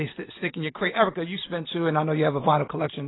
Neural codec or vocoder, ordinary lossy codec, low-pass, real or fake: none; AAC, 16 kbps; 7.2 kHz; real